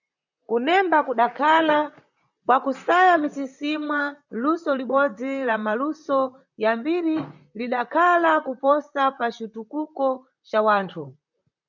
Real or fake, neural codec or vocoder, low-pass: fake; vocoder, 44.1 kHz, 128 mel bands, Pupu-Vocoder; 7.2 kHz